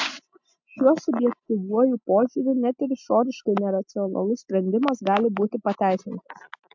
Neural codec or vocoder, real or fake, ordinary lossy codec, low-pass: none; real; MP3, 64 kbps; 7.2 kHz